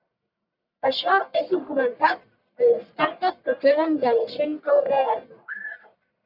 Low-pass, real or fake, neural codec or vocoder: 5.4 kHz; fake; codec, 44.1 kHz, 1.7 kbps, Pupu-Codec